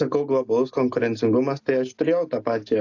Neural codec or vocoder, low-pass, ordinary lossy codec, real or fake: none; 7.2 kHz; AAC, 48 kbps; real